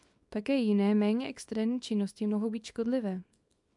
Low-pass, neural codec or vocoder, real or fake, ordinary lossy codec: 10.8 kHz; codec, 24 kHz, 0.9 kbps, WavTokenizer, small release; fake; MP3, 96 kbps